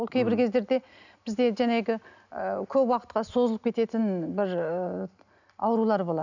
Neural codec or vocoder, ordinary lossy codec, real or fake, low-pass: none; none; real; 7.2 kHz